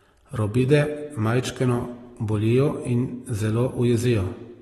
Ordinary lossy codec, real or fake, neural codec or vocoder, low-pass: AAC, 32 kbps; real; none; 19.8 kHz